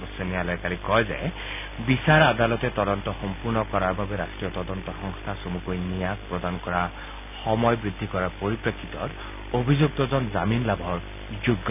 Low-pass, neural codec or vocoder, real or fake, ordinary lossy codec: 3.6 kHz; none; real; none